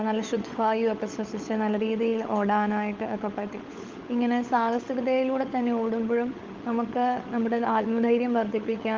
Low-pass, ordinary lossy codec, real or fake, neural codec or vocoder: 7.2 kHz; Opus, 32 kbps; fake; codec, 16 kHz, 16 kbps, FunCodec, trained on LibriTTS, 50 frames a second